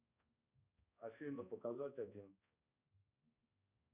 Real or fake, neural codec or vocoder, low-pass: fake; codec, 16 kHz, 1 kbps, X-Codec, HuBERT features, trained on balanced general audio; 3.6 kHz